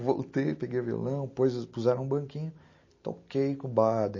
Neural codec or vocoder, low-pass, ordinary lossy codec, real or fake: none; 7.2 kHz; MP3, 32 kbps; real